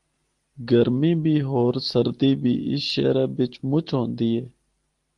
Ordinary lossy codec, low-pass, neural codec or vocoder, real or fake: Opus, 24 kbps; 10.8 kHz; none; real